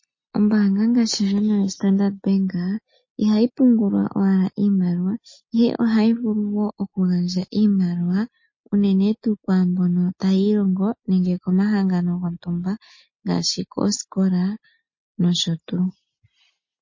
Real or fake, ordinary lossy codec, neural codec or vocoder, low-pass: real; MP3, 32 kbps; none; 7.2 kHz